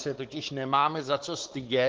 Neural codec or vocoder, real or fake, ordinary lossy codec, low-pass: codec, 16 kHz, 4 kbps, X-Codec, WavLM features, trained on Multilingual LibriSpeech; fake; Opus, 16 kbps; 7.2 kHz